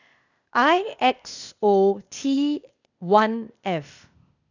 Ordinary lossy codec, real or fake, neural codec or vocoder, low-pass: none; fake; codec, 16 kHz, 0.8 kbps, ZipCodec; 7.2 kHz